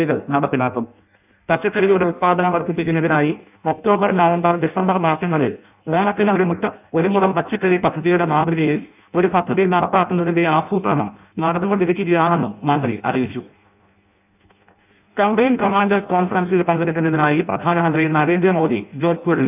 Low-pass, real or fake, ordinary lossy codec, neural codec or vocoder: 3.6 kHz; fake; none; codec, 16 kHz in and 24 kHz out, 0.6 kbps, FireRedTTS-2 codec